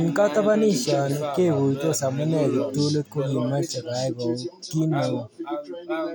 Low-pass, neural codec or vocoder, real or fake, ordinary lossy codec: none; none; real; none